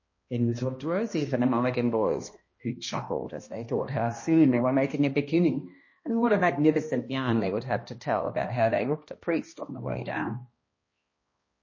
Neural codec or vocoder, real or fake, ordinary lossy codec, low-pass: codec, 16 kHz, 1 kbps, X-Codec, HuBERT features, trained on balanced general audio; fake; MP3, 32 kbps; 7.2 kHz